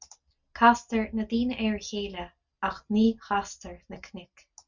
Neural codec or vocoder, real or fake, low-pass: none; real; 7.2 kHz